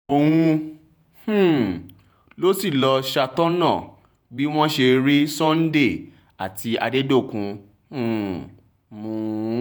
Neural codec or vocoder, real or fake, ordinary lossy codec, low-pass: vocoder, 48 kHz, 128 mel bands, Vocos; fake; none; none